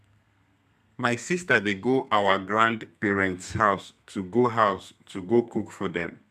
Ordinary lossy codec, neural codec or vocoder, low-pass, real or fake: none; codec, 32 kHz, 1.9 kbps, SNAC; 14.4 kHz; fake